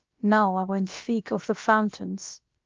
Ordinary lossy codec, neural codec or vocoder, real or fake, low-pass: Opus, 24 kbps; codec, 16 kHz, about 1 kbps, DyCAST, with the encoder's durations; fake; 7.2 kHz